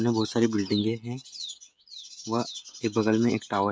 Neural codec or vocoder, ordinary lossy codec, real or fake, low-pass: codec, 16 kHz, 16 kbps, FreqCodec, smaller model; none; fake; none